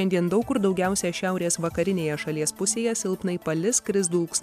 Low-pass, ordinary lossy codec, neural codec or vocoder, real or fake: 14.4 kHz; MP3, 96 kbps; none; real